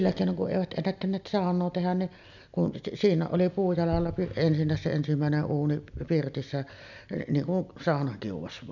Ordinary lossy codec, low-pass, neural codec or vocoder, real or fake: none; 7.2 kHz; none; real